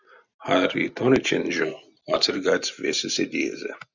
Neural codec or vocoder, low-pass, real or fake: none; 7.2 kHz; real